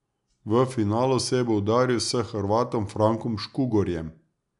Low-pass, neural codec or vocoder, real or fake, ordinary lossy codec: 10.8 kHz; none; real; none